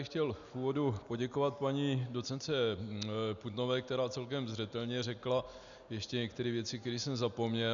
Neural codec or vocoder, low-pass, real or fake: none; 7.2 kHz; real